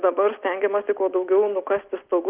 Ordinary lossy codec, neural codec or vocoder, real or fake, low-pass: Opus, 32 kbps; none; real; 3.6 kHz